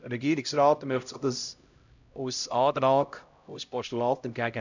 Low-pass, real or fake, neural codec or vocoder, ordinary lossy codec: 7.2 kHz; fake; codec, 16 kHz, 0.5 kbps, X-Codec, HuBERT features, trained on LibriSpeech; none